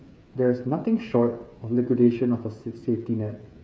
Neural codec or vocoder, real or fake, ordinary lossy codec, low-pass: codec, 16 kHz, 8 kbps, FreqCodec, smaller model; fake; none; none